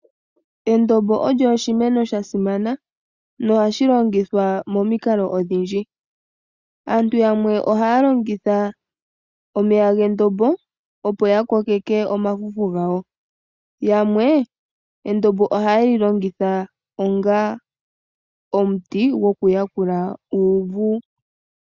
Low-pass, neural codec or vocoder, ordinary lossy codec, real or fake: 7.2 kHz; none; Opus, 64 kbps; real